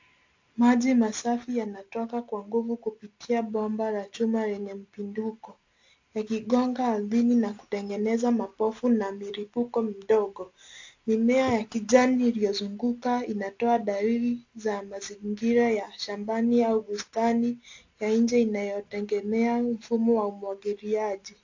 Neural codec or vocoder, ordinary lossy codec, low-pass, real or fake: none; AAC, 48 kbps; 7.2 kHz; real